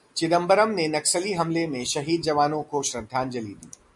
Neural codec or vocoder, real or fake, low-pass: none; real; 10.8 kHz